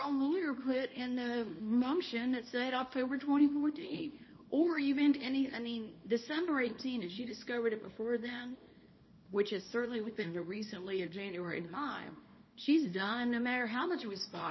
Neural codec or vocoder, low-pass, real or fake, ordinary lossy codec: codec, 24 kHz, 0.9 kbps, WavTokenizer, small release; 7.2 kHz; fake; MP3, 24 kbps